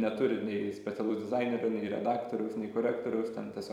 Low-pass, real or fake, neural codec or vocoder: 19.8 kHz; fake; vocoder, 44.1 kHz, 128 mel bands every 256 samples, BigVGAN v2